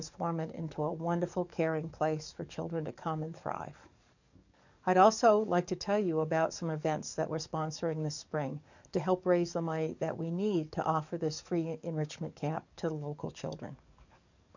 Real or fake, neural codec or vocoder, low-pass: fake; codec, 44.1 kHz, 7.8 kbps, DAC; 7.2 kHz